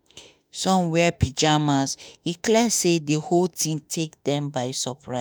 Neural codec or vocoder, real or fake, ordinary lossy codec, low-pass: autoencoder, 48 kHz, 32 numbers a frame, DAC-VAE, trained on Japanese speech; fake; none; none